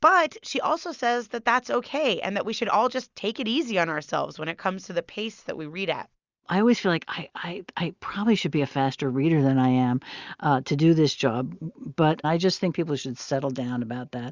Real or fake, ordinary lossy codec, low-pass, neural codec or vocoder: real; Opus, 64 kbps; 7.2 kHz; none